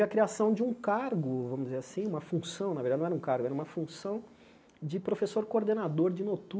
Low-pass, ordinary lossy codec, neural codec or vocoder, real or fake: none; none; none; real